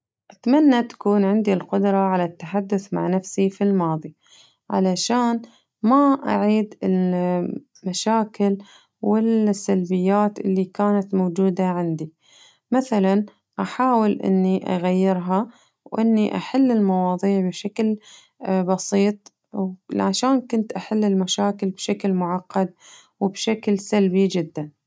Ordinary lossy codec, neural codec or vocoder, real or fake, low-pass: none; none; real; none